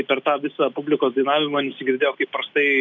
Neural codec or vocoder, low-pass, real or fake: none; 7.2 kHz; real